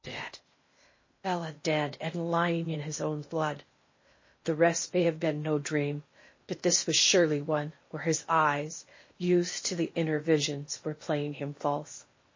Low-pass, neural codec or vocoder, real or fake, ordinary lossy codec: 7.2 kHz; codec, 16 kHz in and 24 kHz out, 0.8 kbps, FocalCodec, streaming, 65536 codes; fake; MP3, 32 kbps